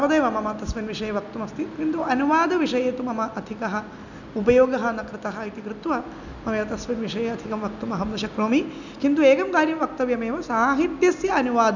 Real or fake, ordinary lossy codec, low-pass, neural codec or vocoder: real; none; 7.2 kHz; none